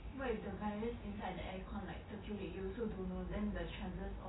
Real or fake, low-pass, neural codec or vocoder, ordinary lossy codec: real; 7.2 kHz; none; AAC, 16 kbps